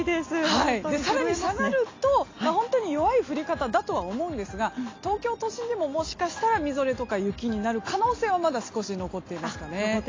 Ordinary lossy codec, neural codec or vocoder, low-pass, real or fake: AAC, 32 kbps; none; 7.2 kHz; real